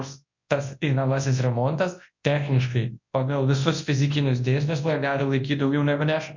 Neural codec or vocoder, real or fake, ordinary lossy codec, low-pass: codec, 24 kHz, 0.9 kbps, WavTokenizer, large speech release; fake; MP3, 48 kbps; 7.2 kHz